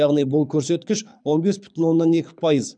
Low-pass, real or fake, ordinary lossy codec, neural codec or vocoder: 9.9 kHz; fake; none; codec, 24 kHz, 6 kbps, HILCodec